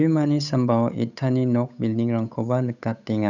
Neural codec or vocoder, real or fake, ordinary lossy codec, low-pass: codec, 16 kHz, 8 kbps, FunCodec, trained on Chinese and English, 25 frames a second; fake; none; 7.2 kHz